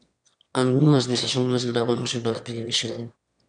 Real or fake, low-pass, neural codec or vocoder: fake; 9.9 kHz; autoencoder, 22.05 kHz, a latent of 192 numbers a frame, VITS, trained on one speaker